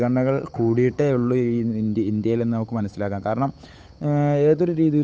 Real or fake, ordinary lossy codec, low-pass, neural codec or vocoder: fake; none; none; codec, 16 kHz, 8 kbps, FunCodec, trained on Chinese and English, 25 frames a second